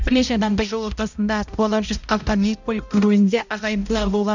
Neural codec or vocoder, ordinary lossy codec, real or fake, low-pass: codec, 16 kHz, 0.5 kbps, X-Codec, HuBERT features, trained on balanced general audio; none; fake; 7.2 kHz